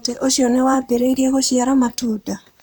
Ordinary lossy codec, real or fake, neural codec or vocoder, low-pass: none; fake; vocoder, 44.1 kHz, 128 mel bands, Pupu-Vocoder; none